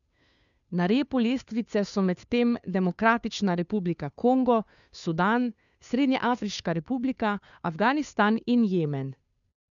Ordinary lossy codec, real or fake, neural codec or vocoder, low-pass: none; fake; codec, 16 kHz, 2 kbps, FunCodec, trained on Chinese and English, 25 frames a second; 7.2 kHz